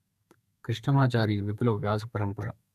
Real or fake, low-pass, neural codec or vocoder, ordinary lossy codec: fake; 14.4 kHz; codec, 32 kHz, 1.9 kbps, SNAC; none